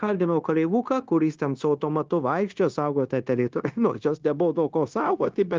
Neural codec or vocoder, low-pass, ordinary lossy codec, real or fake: codec, 16 kHz, 0.9 kbps, LongCat-Audio-Codec; 7.2 kHz; Opus, 32 kbps; fake